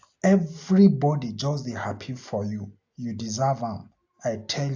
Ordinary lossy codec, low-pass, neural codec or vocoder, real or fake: AAC, 48 kbps; 7.2 kHz; none; real